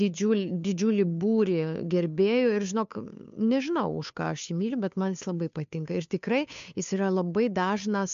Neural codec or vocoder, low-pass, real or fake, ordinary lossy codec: codec, 16 kHz, 2 kbps, FunCodec, trained on Chinese and English, 25 frames a second; 7.2 kHz; fake; MP3, 64 kbps